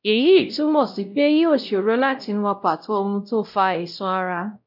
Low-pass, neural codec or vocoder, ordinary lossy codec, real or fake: 5.4 kHz; codec, 16 kHz, 0.5 kbps, X-Codec, WavLM features, trained on Multilingual LibriSpeech; none; fake